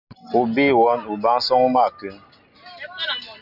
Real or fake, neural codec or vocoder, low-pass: real; none; 5.4 kHz